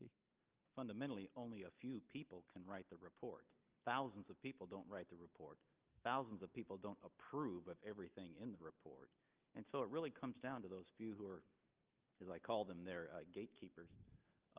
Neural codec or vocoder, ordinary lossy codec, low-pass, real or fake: none; Opus, 32 kbps; 3.6 kHz; real